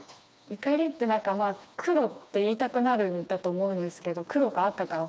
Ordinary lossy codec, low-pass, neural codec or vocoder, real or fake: none; none; codec, 16 kHz, 2 kbps, FreqCodec, smaller model; fake